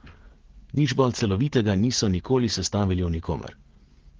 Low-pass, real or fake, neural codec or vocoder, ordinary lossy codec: 7.2 kHz; fake; codec, 16 kHz, 16 kbps, FreqCodec, smaller model; Opus, 16 kbps